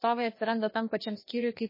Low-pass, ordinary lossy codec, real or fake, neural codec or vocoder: 5.4 kHz; MP3, 24 kbps; fake; codec, 16 kHz, 2 kbps, FreqCodec, larger model